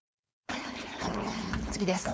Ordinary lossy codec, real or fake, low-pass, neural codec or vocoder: none; fake; none; codec, 16 kHz, 4.8 kbps, FACodec